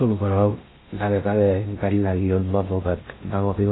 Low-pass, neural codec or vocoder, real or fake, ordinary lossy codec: 7.2 kHz; codec, 16 kHz in and 24 kHz out, 0.6 kbps, FocalCodec, streaming, 4096 codes; fake; AAC, 16 kbps